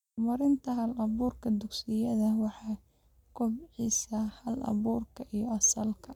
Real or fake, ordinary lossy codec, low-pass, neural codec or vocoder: real; none; 19.8 kHz; none